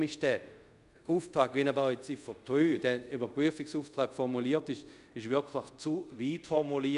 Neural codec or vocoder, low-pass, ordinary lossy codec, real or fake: codec, 24 kHz, 0.5 kbps, DualCodec; 10.8 kHz; none; fake